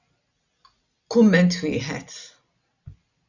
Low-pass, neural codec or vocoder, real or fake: 7.2 kHz; none; real